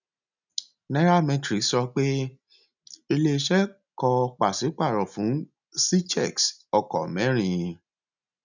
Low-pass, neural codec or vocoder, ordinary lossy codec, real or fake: 7.2 kHz; none; none; real